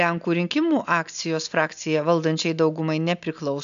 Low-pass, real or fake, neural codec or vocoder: 7.2 kHz; real; none